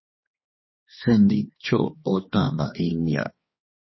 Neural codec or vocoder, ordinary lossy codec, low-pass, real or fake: codec, 16 kHz, 2 kbps, X-Codec, HuBERT features, trained on balanced general audio; MP3, 24 kbps; 7.2 kHz; fake